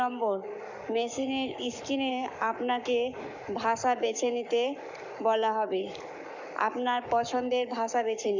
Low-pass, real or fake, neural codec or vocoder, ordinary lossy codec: 7.2 kHz; fake; codec, 44.1 kHz, 7.8 kbps, Pupu-Codec; none